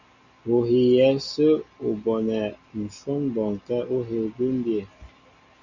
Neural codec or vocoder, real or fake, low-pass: none; real; 7.2 kHz